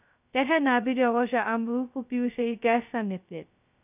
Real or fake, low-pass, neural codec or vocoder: fake; 3.6 kHz; codec, 16 kHz, 0.2 kbps, FocalCodec